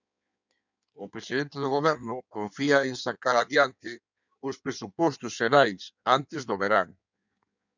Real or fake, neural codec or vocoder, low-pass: fake; codec, 16 kHz in and 24 kHz out, 1.1 kbps, FireRedTTS-2 codec; 7.2 kHz